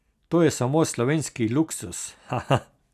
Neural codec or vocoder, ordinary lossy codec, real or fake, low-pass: none; none; real; 14.4 kHz